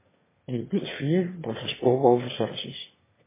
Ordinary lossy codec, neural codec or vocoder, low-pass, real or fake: MP3, 16 kbps; autoencoder, 22.05 kHz, a latent of 192 numbers a frame, VITS, trained on one speaker; 3.6 kHz; fake